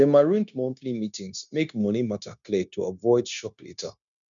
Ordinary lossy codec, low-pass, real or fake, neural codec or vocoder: none; 7.2 kHz; fake; codec, 16 kHz, 0.9 kbps, LongCat-Audio-Codec